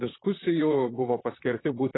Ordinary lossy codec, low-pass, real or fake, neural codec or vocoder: AAC, 16 kbps; 7.2 kHz; fake; vocoder, 44.1 kHz, 128 mel bands, Pupu-Vocoder